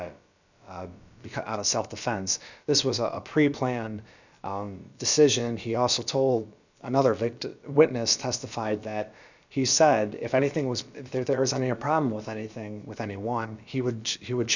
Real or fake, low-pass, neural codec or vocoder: fake; 7.2 kHz; codec, 16 kHz, about 1 kbps, DyCAST, with the encoder's durations